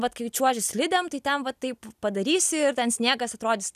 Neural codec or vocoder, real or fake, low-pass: none; real; 14.4 kHz